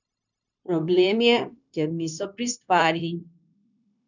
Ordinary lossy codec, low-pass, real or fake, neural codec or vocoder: none; 7.2 kHz; fake; codec, 16 kHz, 0.9 kbps, LongCat-Audio-Codec